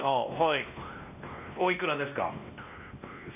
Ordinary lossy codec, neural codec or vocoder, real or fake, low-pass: none; codec, 16 kHz, 1 kbps, X-Codec, WavLM features, trained on Multilingual LibriSpeech; fake; 3.6 kHz